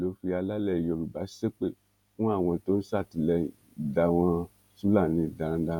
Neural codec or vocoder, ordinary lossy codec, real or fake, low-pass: vocoder, 48 kHz, 128 mel bands, Vocos; none; fake; 19.8 kHz